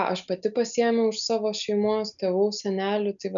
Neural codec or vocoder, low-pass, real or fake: none; 7.2 kHz; real